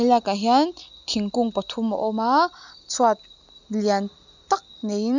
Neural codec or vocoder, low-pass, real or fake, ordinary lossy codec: none; 7.2 kHz; real; none